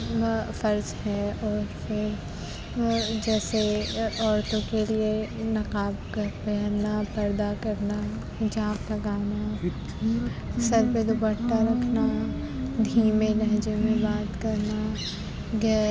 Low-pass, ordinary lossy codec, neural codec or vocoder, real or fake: none; none; none; real